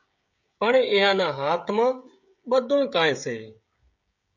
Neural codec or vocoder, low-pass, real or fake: codec, 16 kHz, 16 kbps, FreqCodec, smaller model; 7.2 kHz; fake